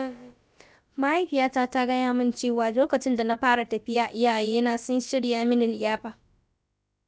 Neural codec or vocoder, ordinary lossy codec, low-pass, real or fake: codec, 16 kHz, about 1 kbps, DyCAST, with the encoder's durations; none; none; fake